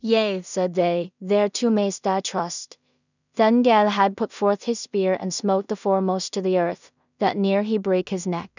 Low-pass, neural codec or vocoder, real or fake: 7.2 kHz; codec, 16 kHz in and 24 kHz out, 0.4 kbps, LongCat-Audio-Codec, two codebook decoder; fake